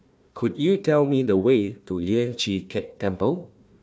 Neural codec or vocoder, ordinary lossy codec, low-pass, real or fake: codec, 16 kHz, 1 kbps, FunCodec, trained on Chinese and English, 50 frames a second; none; none; fake